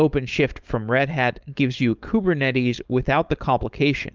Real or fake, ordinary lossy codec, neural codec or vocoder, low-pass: fake; Opus, 24 kbps; codec, 16 kHz, 4.8 kbps, FACodec; 7.2 kHz